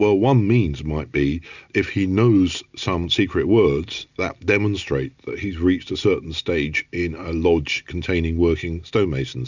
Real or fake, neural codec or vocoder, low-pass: real; none; 7.2 kHz